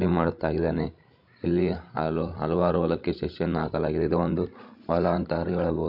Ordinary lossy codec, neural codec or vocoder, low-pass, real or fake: none; codec, 16 kHz, 16 kbps, FreqCodec, larger model; 5.4 kHz; fake